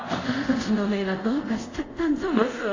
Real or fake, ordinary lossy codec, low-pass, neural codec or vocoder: fake; AAC, 48 kbps; 7.2 kHz; codec, 24 kHz, 0.5 kbps, DualCodec